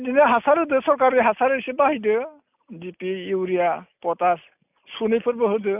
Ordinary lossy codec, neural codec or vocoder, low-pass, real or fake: none; none; 3.6 kHz; real